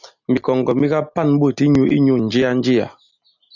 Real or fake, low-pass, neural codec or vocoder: real; 7.2 kHz; none